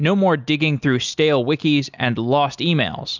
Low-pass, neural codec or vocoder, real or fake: 7.2 kHz; none; real